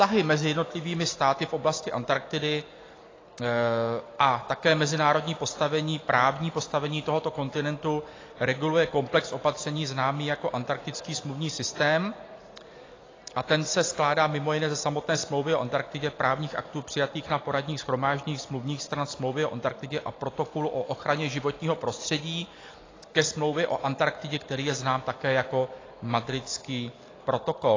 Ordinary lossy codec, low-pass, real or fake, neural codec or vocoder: AAC, 32 kbps; 7.2 kHz; real; none